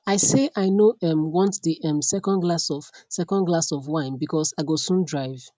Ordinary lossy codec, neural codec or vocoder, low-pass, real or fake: none; none; none; real